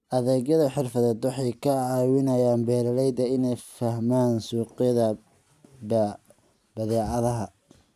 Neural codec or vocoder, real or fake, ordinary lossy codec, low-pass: none; real; none; 14.4 kHz